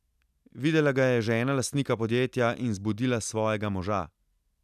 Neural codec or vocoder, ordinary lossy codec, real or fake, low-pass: none; none; real; 14.4 kHz